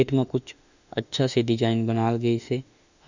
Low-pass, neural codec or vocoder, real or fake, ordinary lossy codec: 7.2 kHz; autoencoder, 48 kHz, 32 numbers a frame, DAC-VAE, trained on Japanese speech; fake; none